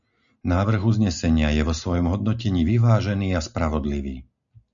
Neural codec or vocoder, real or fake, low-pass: none; real; 7.2 kHz